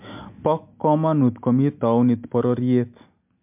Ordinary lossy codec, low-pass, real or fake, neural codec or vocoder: MP3, 32 kbps; 3.6 kHz; real; none